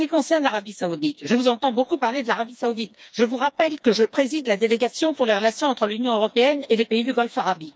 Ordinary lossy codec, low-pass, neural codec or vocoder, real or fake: none; none; codec, 16 kHz, 2 kbps, FreqCodec, smaller model; fake